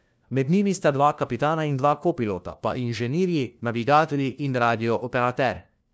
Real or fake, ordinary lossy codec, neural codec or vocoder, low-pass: fake; none; codec, 16 kHz, 1 kbps, FunCodec, trained on LibriTTS, 50 frames a second; none